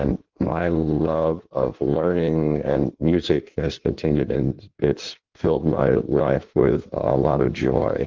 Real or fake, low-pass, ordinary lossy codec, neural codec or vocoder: fake; 7.2 kHz; Opus, 32 kbps; codec, 16 kHz in and 24 kHz out, 1.1 kbps, FireRedTTS-2 codec